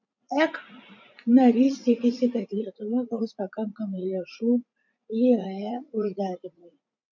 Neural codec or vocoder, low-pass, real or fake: vocoder, 44.1 kHz, 80 mel bands, Vocos; 7.2 kHz; fake